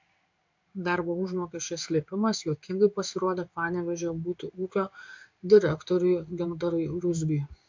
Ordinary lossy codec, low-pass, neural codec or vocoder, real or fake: MP3, 64 kbps; 7.2 kHz; codec, 16 kHz in and 24 kHz out, 1 kbps, XY-Tokenizer; fake